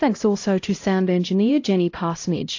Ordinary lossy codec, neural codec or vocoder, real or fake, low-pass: AAC, 48 kbps; codec, 16 kHz, 0.5 kbps, X-Codec, HuBERT features, trained on LibriSpeech; fake; 7.2 kHz